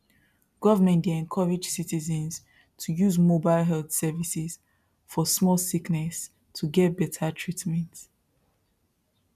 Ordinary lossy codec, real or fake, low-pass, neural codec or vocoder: none; real; 14.4 kHz; none